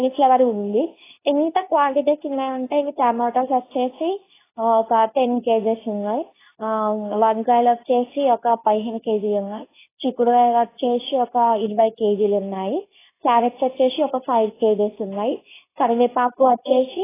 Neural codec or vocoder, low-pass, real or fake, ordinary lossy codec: codec, 24 kHz, 0.9 kbps, WavTokenizer, medium speech release version 2; 3.6 kHz; fake; AAC, 16 kbps